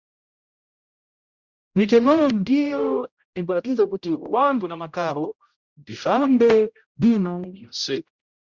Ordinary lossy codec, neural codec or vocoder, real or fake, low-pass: Opus, 64 kbps; codec, 16 kHz, 0.5 kbps, X-Codec, HuBERT features, trained on general audio; fake; 7.2 kHz